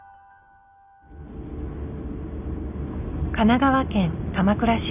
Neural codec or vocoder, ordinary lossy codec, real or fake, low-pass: none; none; real; 3.6 kHz